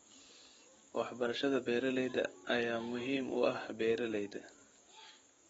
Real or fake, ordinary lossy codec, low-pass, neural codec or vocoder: fake; AAC, 24 kbps; 19.8 kHz; codec, 44.1 kHz, 7.8 kbps, DAC